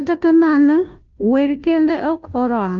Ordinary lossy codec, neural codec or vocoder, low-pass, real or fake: Opus, 32 kbps; codec, 16 kHz, 0.5 kbps, FunCodec, trained on Chinese and English, 25 frames a second; 7.2 kHz; fake